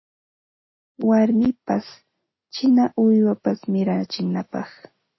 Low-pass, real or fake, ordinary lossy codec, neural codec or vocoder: 7.2 kHz; real; MP3, 24 kbps; none